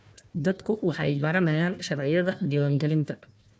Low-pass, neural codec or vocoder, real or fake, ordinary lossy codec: none; codec, 16 kHz, 1 kbps, FunCodec, trained on Chinese and English, 50 frames a second; fake; none